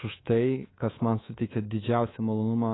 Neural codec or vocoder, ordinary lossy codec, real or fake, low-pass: codec, 24 kHz, 3.1 kbps, DualCodec; AAC, 16 kbps; fake; 7.2 kHz